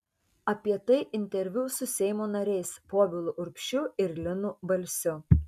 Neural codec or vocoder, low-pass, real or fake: none; 14.4 kHz; real